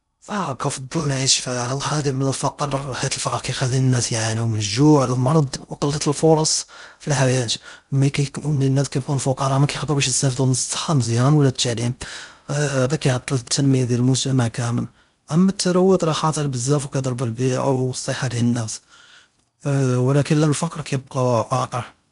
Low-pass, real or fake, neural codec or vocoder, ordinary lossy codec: 10.8 kHz; fake; codec, 16 kHz in and 24 kHz out, 0.6 kbps, FocalCodec, streaming, 2048 codes; none